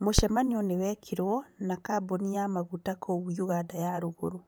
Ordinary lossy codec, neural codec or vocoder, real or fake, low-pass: none; vocoder, 44.1 kHz, 128 mel bands, Pupu-Vocoder; fake; none